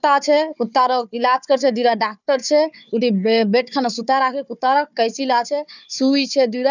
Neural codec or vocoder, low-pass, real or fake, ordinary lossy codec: codec, 16 kHz, 4 kbps, FunCodec, trained on Chinese and English, 50 frames a second; 7.2 kHz; fake; none